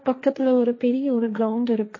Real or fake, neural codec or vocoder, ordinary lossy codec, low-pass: fake; codec, 16 kHz, 1.1 kbps, Voila-Tokenizer; MP3, 32 kbps; 7.2 kHz